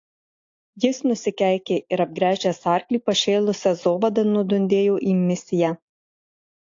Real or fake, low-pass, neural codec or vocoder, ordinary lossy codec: real; 7.2 kHz; none; AAC, 48 kbps